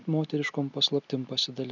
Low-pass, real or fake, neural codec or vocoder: 7.2 kHz; real; none